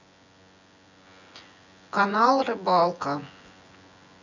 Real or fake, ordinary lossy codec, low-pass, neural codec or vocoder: fake; none; 7.2 kHz; vocoder, 24 kHz, 100 mel bands, Vocos